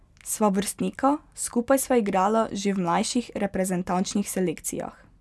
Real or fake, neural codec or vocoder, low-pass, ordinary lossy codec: real; none; none; none